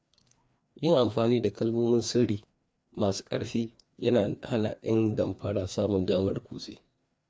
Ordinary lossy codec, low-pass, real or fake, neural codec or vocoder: none; none; fake; codec, 16 kHz, 2 kbps, FreqCodec, larger model